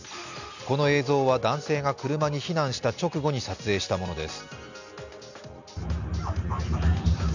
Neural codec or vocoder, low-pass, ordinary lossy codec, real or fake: none; 7.2 kHz; none; real